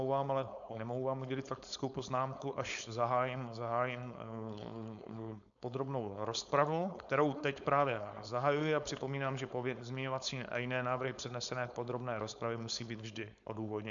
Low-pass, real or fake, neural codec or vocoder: 7.2 kHz; fake; codec, 16 kHz, 4.8 kbps, FACodec